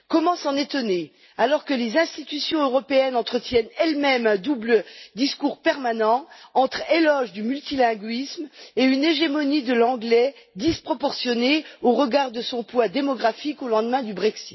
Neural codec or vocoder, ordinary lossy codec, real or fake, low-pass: none; MP3, 24 kbps; real; 7.2 kHz